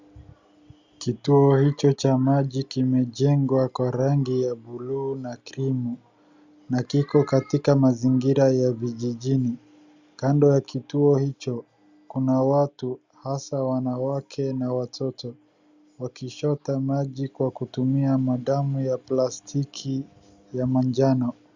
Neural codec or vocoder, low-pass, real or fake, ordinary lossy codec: none; 7.2 kHz; real; Opus, 64 kbps